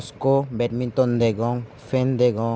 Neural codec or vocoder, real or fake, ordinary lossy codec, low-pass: none; real; none; none